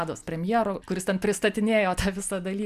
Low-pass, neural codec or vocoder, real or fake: 14.4 kHz; none; real